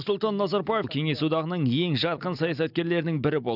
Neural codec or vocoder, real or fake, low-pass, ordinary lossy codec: none; real; 5.4 kHz; none